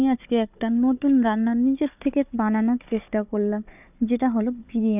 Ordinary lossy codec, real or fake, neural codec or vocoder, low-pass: none; fake; codec, 16 kHz, 4 kbps, FunCodec, trained on Chinese and English, 50 frames a second; 3.6 kHz